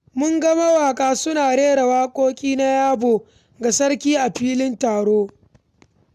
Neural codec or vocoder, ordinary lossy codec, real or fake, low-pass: none; none; real; 14.4 kHz